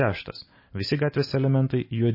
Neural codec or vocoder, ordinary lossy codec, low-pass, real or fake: none; MP3, 24 kbps; 5.4 kHz; real